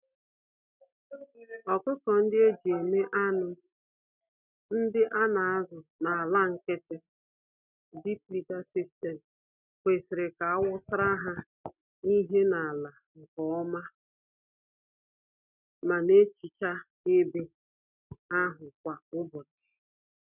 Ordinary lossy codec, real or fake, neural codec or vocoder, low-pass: none; real; none; 3.6 kHz